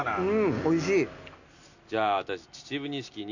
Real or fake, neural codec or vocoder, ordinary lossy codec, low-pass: real; none; none; 7.2 kHz